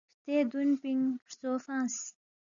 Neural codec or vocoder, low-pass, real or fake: none; 7.2 kHz; real